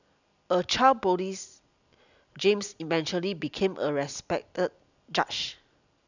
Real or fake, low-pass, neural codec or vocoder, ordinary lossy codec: real; 7.2 kHz; none; none